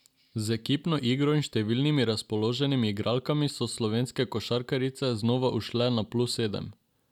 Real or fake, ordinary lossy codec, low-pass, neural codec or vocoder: real; none; 19.8 kHz; none